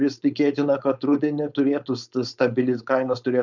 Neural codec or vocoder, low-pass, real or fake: codec, 16 kHz, 4.8 kbps, FACodec; 7.2 kHz; fake